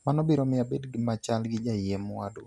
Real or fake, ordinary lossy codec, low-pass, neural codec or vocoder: real; none; none; none